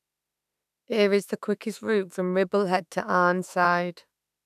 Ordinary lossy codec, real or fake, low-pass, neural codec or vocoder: none; fake; 14.4 kHz; autoencoder, 48 kHz, 32 numbers a frame, DAC-VAE, trained on Japanese speech